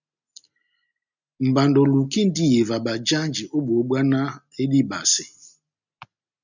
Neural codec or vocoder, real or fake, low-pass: none; real; 7.2 kHz